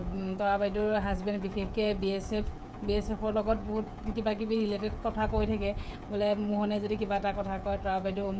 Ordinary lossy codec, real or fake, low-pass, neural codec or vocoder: none; fake; none; codec, 16 kHz, 16 kbps, FreqCodec, smaller model